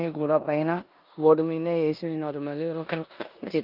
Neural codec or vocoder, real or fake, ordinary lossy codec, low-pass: codec, 16 kHz in and 24 kHz out, 0.9 kbps, LongCat-Audio-Codec, four codebook decoder; fake; Opus, 32 kbps; 5.4 kHz